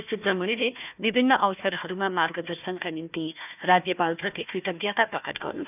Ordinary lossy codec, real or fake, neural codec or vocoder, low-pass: none; fake; codec, 16 kHz, 1 kbps, FunCodec, trained on Chinese and English, 50 frames a second; 3.6 kHz